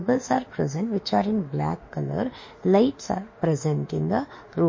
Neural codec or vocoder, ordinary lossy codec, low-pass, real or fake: autoencoder, 48 kHz, 32 numbers a frame, DAC-VAE, trained on Japanese speech; MP3, 32 kbps; 7.2 kHz; fake